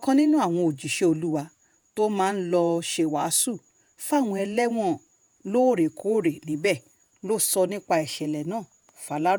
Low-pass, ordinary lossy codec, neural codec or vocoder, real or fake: none; none; vocoder, 48 kHz, 128 mel bands, Vocos; fake